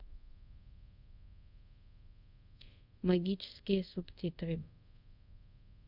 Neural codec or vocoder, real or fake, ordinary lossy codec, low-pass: codec, 24 kHz, 0.5 kbps, DualCodec; fake; none; 5.4 kHz